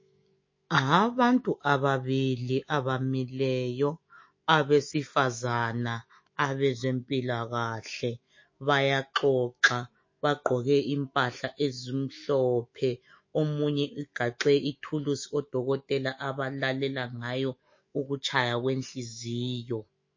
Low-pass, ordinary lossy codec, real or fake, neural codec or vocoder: 7.2 kHz; MP3, 32 kbps; fake; autoencoder, 48 kHz, 128 numbers a frame, DAC-VAE, trained on Japanese speech